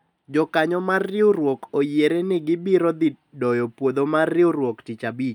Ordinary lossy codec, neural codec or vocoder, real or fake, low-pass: none; none; real; 19.8 kHz